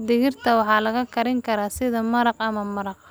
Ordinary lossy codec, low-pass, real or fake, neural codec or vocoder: none; none; real; none